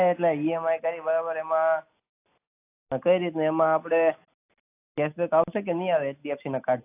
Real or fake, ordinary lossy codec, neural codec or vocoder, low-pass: real; AAC, 24 kbps; none; 3.6 kHz